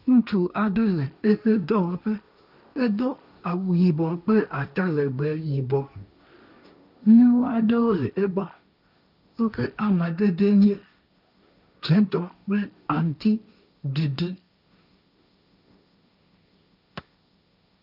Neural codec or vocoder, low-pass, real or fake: codec, 16 kHz, 1.1 kbps, Voila-Tokenizer; 5.4 kHz; fake